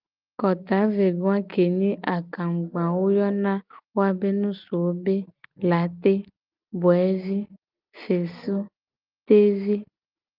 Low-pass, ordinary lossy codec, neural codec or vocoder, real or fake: 5.4 kHz; Opus, 24 kbps; none; real